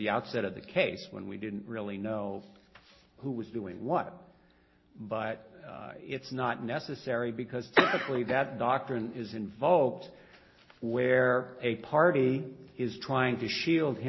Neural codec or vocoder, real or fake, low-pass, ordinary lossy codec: none; real; 7.2 kHz; MP3, 24 kbps